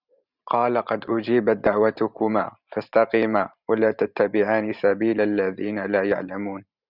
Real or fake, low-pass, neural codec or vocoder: fake; 5.4 kHz; vocoder, 44.1 kHz, 128 mel bands every 512 samples, BigVGAN v2